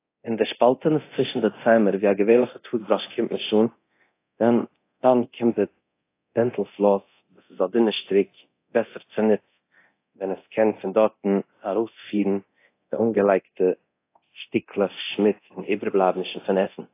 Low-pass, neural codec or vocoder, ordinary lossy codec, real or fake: 3.6 kHz; codec, 24 kHz, 0.9 kbps, DualCodec; AAC, 24 kbps; fake